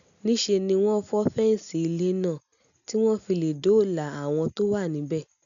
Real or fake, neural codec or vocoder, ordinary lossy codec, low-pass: real; none; none; 7.2 kHz